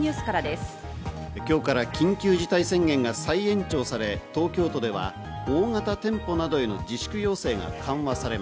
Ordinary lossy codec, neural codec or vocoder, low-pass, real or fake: none; none; none; real